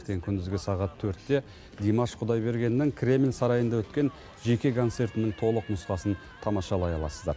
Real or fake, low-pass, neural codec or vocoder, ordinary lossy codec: real; none; none; none